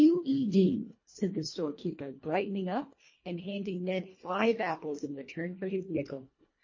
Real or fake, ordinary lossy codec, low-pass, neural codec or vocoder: fake; MP3, 32 kbps; 7.2 kHz; codec, 24 kHz, 1.5 kbps, HILCodec